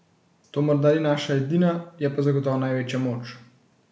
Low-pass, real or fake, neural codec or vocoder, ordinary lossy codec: none; real; none; none